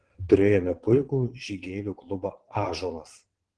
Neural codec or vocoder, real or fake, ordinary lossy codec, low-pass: vocoder, 22.05 kHz, 80 mel bands, WaveNeXt; fake; Opus, 16 kbps; 9.9 kHz